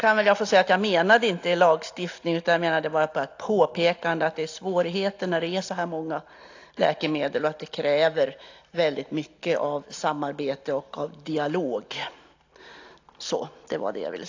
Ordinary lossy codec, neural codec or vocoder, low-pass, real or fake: AAC, 48 kbps; none; 7.2 kHz; real